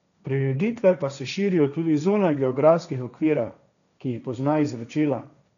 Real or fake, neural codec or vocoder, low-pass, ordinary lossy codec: fake; codec, 16 kHz, 1.1 kbps, Voila-Tokenizer; 7.2 kHz; none